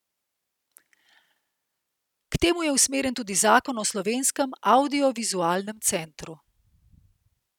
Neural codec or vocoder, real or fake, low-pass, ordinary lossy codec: none; real; 19.8 kHz; none